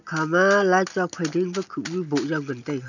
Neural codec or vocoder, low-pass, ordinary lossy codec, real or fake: none; 7.2 kHz; none; real